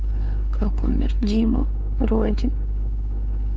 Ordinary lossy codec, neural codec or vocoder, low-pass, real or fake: none; codec, 16 kHz, 4 kbps, X-Codec, WavLM features, trained on Multilingual LibriSpeech; none; fake